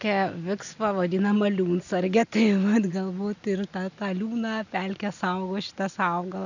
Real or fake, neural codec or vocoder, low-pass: real; none; 7.2 kHz